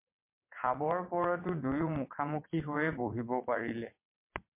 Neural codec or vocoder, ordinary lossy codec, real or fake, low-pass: vocoder, 22.05 kHz, 80 mel bands, WaveNeXt; MP3, 24 kbps; fake; 3.6 kHz